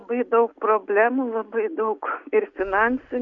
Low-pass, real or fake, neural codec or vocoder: 7.2 kHz; real; none